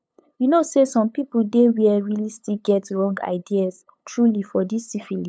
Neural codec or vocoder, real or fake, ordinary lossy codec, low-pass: codec, 16 kHz, 8 kbps, FunCodec, trained on LibriTTS, 25 frames a second; fake; none; none